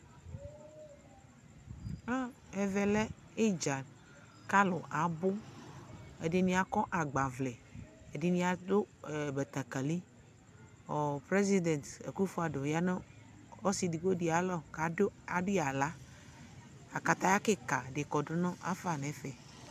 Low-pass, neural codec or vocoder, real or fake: 14.4 kHz; none; real